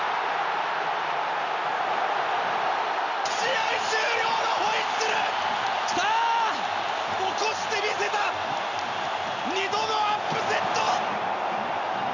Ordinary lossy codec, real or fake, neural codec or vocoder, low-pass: none; real; none; 7.2 kHz